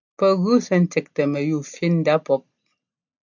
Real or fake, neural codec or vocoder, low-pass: real; none; 7.2 kHz